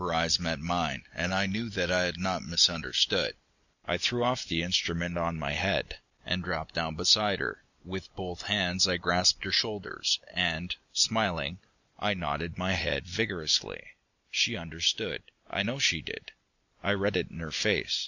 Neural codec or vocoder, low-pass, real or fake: none; 7.2 kHz; real